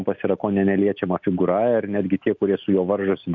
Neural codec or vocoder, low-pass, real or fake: none; 7.2 kHz; real